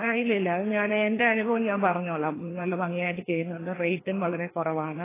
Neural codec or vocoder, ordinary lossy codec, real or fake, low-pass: codec, 16 kHz, 2 kbps, FreqCodec, larger model; AAC, 16 kbps; fake; 3.6 kHz